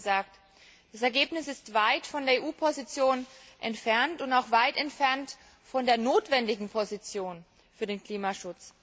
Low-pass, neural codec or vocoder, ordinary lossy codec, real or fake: none; none; none; real